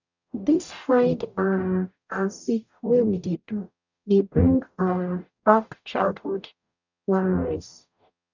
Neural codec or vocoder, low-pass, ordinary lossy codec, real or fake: codec, 44.1 kHz, 0.9 kbps, DAC; 7.2 kHz; none; fake